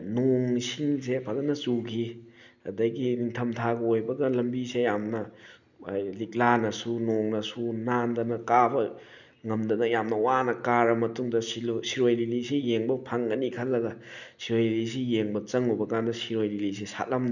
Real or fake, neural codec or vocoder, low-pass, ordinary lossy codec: real; none; 7.2 kHz; none